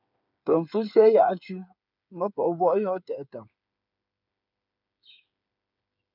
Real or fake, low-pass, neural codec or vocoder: fake; 5.4 kHz; codec, 16 kHz, 8 kbps, FreqCodec, smaller model